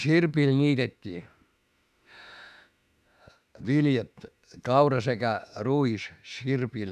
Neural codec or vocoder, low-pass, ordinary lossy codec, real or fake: autoencoder, 48 kHz, 32 numbers a frame, DAC-VAE, trained on Japanese speech; 14.4 kHz; none; fake